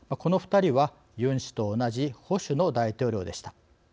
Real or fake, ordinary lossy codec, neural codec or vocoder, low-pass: real; none; none; none